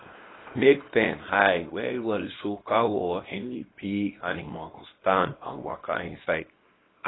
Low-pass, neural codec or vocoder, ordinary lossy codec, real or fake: 7.2 kHz; codec, 24 kHz, 0.9 kbps, WavTokenizer, small release; AAC, 16 kbps; fake